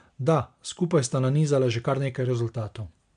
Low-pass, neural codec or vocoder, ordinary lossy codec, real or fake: 9.9 kHz; none; MP3, 64 kbps; real